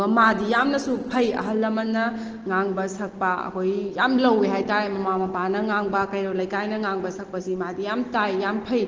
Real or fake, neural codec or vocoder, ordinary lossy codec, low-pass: real; none; Opus, 16 kbps; 7.2 kHz